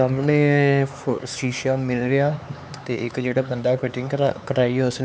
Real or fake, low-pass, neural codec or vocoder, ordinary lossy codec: fake; none; codec, 16 kHz, 4 kbps, X-Codec, HuBERT features, trained on LibriSpeech; none